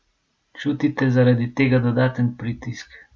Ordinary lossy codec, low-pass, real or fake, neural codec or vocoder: none; none; real; none